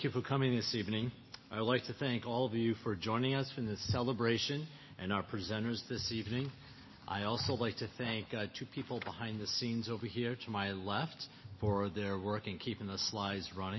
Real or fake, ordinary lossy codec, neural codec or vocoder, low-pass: real; MP3, 24 kbps; none; 7.2 kHz